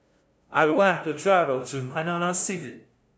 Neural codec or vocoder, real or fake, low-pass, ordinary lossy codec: codec, 16 kHz, 0.5 kbps, FunCodec, trained on LibriTTS, 25 frames a second; fake; none; none